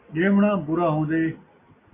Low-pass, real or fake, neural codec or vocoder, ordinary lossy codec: 3.6 kHz; real; none; MP3, 24 kbps